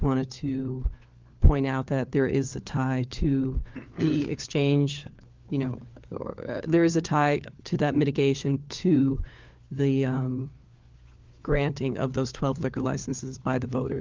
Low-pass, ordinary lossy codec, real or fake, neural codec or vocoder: 7.2 kHz; Opus, 32 kbps; fake; codec, 16 kHz, 4 kbps, FunCodec, trained on LibriTTS, 50 frames a second